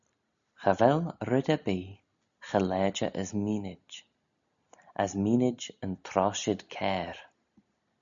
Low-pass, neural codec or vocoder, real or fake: 7.2 kHz; none; real